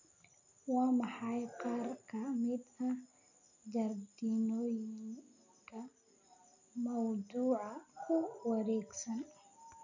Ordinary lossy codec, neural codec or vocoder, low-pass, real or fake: none; none; 7.2 kHz; real